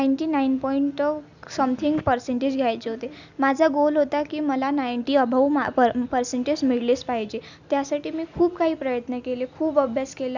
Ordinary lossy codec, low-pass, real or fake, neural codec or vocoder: none; 7.2 kHz; real; none